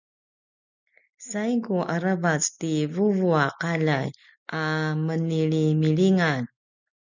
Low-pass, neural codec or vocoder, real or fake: 7.2 kHz; none; real